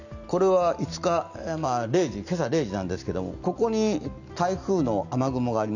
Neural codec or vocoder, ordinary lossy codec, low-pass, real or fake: none; none; 7.2 kHz; real